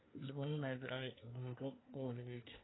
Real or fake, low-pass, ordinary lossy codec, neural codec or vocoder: fake; 7.2 kHz; AAC, 16 kbps; codec, 24 kHz, 1 kbps, SNAC